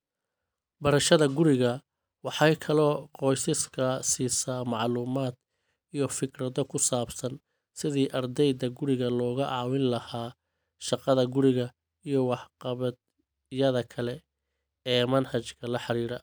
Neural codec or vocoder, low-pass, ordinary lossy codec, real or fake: none; none; none; real